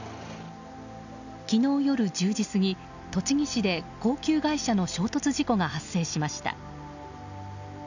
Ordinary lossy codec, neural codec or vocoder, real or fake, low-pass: none; none; real; 7.2 kHz